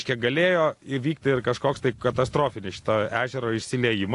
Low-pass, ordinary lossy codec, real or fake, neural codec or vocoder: 10.8 kHz; AAC, 48 kbps; real; none